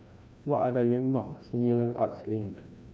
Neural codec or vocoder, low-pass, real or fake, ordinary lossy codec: codec, 16 kHz, 1 kbps, FreqCodec, larger model; none; fake; none